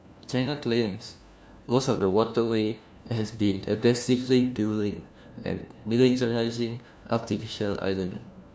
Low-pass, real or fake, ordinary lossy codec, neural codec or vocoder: none; fake; none; codec, 16 kHz, 1 kbps, FunCodec, trained on LibriTTS, 50 frames a second